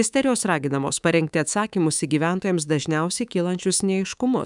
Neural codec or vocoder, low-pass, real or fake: codec, 24 kHz, 3.1 kbps, DualCodec; 10.8 kHz; fake